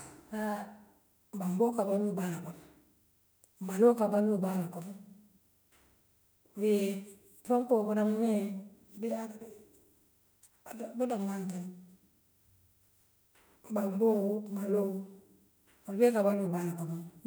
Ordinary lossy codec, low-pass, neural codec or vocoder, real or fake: none; none; autoencoder, 48 kHz, 32 numbers a frame, DAC-VAE, trained on Japanese speech; fake